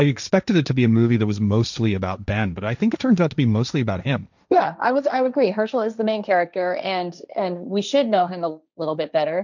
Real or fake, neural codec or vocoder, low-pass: fake; codec, 16 kHz, 1.1 kbps, Voila-Tokenizer; 7.2 kHz